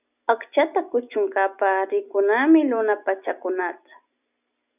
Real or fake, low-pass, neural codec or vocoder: real; 3.6 kHz; none